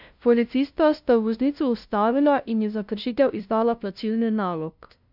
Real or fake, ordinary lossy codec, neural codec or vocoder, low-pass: fake; none; codec, 16 kHz, 0.5 kbps, FunCodec, trained on LibriTTS, 25 frames a second; 5.4 kHz